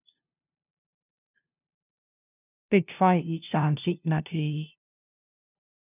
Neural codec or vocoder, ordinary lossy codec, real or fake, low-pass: codec, 16 kHz, 0.5 kbps, FunCodec, trained on LibriTTS, 25 frames a second; none; fake; 3.6 kHz